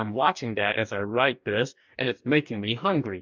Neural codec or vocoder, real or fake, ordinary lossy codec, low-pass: codec, 44.1 kHz, 2.6 kbps, DAC; fake; MP3, 64 kbps; 7.2 kHz